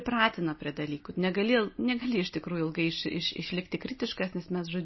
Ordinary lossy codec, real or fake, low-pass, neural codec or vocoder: MP3, 24 kbps; real; 7.2 kHz; none